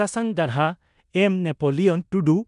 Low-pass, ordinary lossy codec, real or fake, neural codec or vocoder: 10.8 kHz; none; fake; codec, 16 kHz in and 24 kHz out, 0.9 kbps, LongCat-Audio-Codec, four codebook decoder